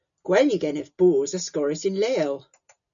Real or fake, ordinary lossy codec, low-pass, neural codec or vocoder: real; MP3, 96 kbps; 7.2 kHz; none